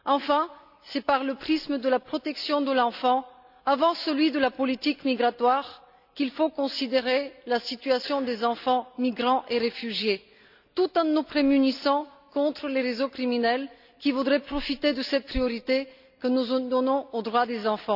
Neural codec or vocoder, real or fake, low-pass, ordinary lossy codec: none; real; 5.4 kHz; none